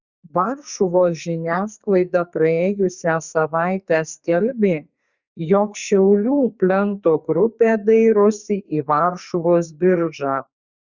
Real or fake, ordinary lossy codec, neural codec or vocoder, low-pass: fake; Opus, 64 kbps; codec, 44.1 kHz, 2.6 kbps, SNAC; 7.2 kHz